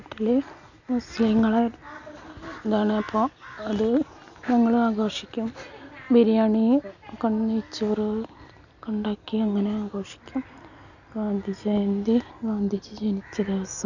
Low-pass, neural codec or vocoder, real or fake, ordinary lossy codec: 7.2 kHz; none; real; none